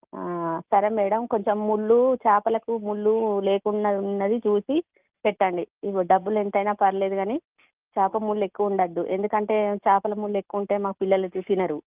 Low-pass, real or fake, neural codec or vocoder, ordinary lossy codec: 3.6 kHz; real; none; Opus, 24 kbps